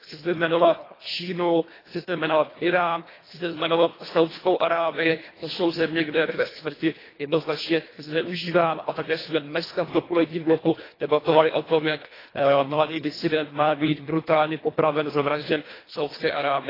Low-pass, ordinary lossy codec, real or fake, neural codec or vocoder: 5.4 kHz; AAC, 24 kbps; fake; codec, 24 kHz, 1.5 kbps, HILCodec